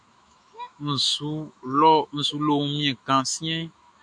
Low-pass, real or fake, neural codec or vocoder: 9.9 kHz; fake; autoencoder, 48 kHz, 128 numbers a frame, DAC-VAE, trained on Japanese speech